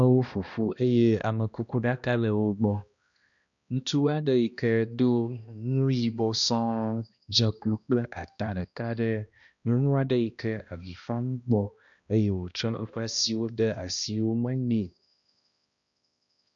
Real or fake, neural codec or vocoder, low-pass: fake; codec, 16 kHz, 1 kbps, X-Codec, HuBERT features, trained on balanced general audio; 7.2 kHz